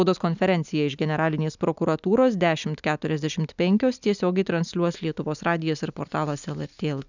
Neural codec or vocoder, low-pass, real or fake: none; 7.2 kHz; real